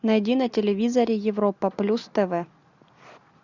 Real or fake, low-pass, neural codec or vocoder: real; 7.2 kHz; none